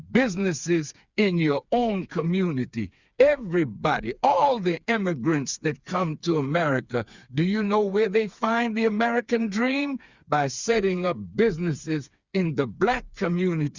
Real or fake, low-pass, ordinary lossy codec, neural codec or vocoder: fake; 7.2 kHz; Opus, 64 kbps; codec, 16 kHz, 4 kbps, FreqCodec, smaller model